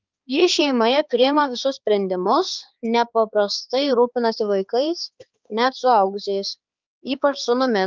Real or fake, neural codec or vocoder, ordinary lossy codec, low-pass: fake; codec, 16 kHz, 4 kbps, X-Codec, HuBERT features, trained on balanced general audio; Opus, 24 kbps; 7.2 kHz